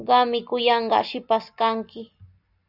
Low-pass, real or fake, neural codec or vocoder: 5.4 kHz; real; none